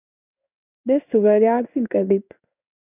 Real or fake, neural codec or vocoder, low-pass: fake; codec, 16 kHz in and 24 kHz out, 1 kbps, XY-Tokenizer; 3.6 kHz